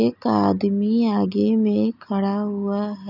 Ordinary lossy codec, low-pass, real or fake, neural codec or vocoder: none; 5.4 kHz; real; none